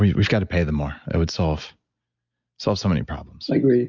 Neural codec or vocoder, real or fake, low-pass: none; real; 7.2 kHz